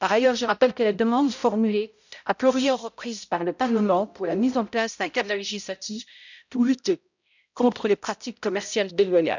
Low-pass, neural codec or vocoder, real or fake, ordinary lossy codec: 7.2 kHz; codec, 16 kHz, 0.5 kbps, X-Codec, HuBERT features, trained on balanced general audio; fake; none